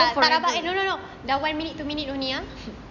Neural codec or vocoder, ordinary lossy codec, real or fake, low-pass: none; none; real; 7.2 kHz